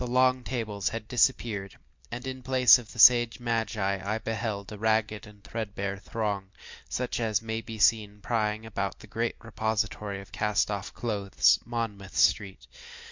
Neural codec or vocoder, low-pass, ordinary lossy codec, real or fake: none; 7.2 kHz; MP3, 64 kbps; real